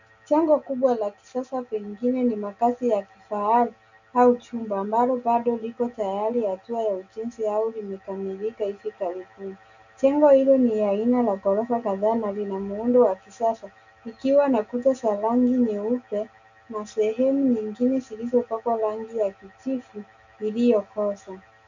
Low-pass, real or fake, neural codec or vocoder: 7.2 kHz; real; none